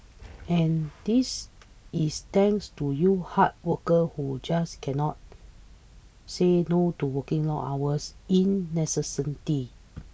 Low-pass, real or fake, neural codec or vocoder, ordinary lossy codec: none; real; none; none